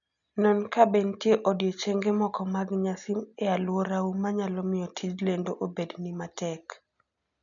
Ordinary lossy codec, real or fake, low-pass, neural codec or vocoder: none; real; 7.2 kHz; none